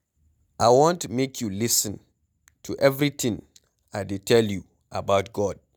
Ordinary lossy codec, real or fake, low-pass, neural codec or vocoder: none; real; none; none